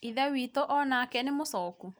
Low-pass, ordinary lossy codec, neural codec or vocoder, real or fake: none; none; none; real